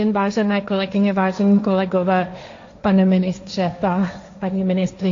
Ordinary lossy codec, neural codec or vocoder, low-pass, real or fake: AAC, 48 kbps; codec, 16 kHz, 1.1 kbps, Voila-Tokenizer; 7.2 kHz; fake